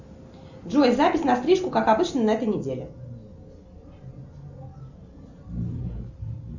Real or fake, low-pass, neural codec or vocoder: real; 7.2 kHz; none